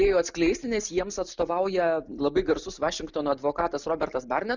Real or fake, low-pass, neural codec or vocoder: real; 7.2 kHz; none